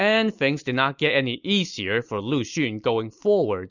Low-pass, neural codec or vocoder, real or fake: 7.2 kHz; none; real